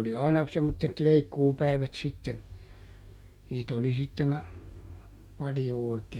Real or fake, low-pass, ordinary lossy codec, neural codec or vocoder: fake; 19.8 kHz; MP3, 96 kbps; codec, 44.1 kHz, 2.6 kbps, DAC